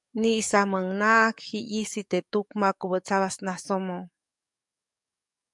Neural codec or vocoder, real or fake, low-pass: codec, 44.1 kHz, 7.8 kbps, DAC; fake; 10.8 kHz